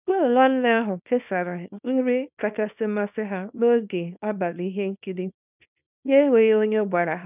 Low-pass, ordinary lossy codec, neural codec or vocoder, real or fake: 3.6 kHz; none; codec, 24 kHz, 0.9 kbps, WavTokenizer, small release; fake